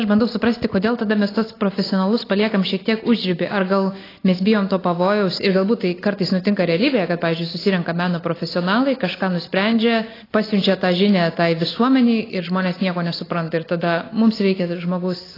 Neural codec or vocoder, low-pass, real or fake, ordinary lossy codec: none; 5.4 kHz; real; AAC, 24 kbps